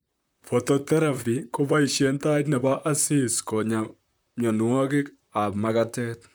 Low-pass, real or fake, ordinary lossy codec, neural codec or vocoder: none; fake; none; vocoder, 44.1 kHz, 128 mel bands, Pupu-Vocoder